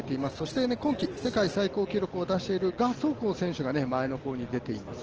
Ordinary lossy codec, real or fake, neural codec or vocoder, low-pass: Opus, 16 kbps; real; none; 7.2 kHz